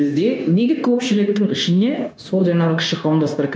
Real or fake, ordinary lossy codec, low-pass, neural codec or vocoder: fake; none; none; codec, 16 kHz, 0.9 kbps, LongCat-Audio-Codec